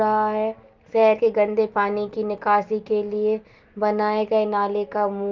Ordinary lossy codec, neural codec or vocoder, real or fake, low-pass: Opus, 32 kbps; none; real; 7.2 kHz